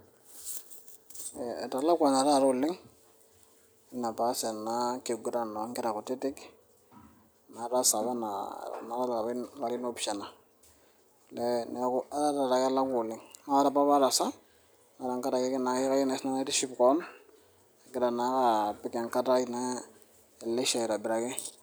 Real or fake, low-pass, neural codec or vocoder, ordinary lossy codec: real; none; none; none